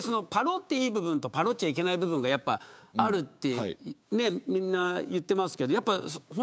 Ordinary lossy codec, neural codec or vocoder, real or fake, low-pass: none; codec, 16 kHz, 6 kbps, DAC; fake; none